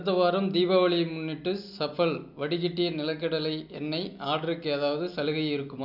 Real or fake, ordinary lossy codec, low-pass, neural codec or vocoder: real; none; 5.4 kHz; none